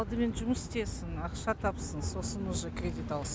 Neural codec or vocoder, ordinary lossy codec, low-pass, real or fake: none; none; none; real